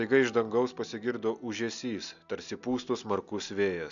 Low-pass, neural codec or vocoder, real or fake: 7.2 kHz; none; real